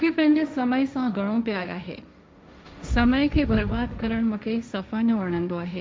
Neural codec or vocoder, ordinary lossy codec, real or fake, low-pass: codec, 16 kHz, 1.1 kbps, Voila-Tokenizer; none; fake; none